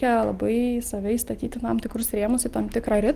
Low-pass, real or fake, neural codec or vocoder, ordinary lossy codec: 14.4 kHz; real; none; Opus, 24 kbps